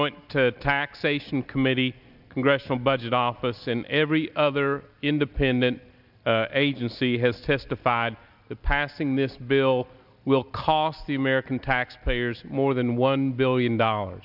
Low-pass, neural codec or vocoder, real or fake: 5.4 kHz; none; real